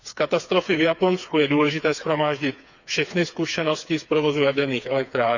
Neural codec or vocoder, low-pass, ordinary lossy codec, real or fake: codec, 16 kHz, 4 kbps, FreqCodec, smaller model; 7.2 kHz; none; fake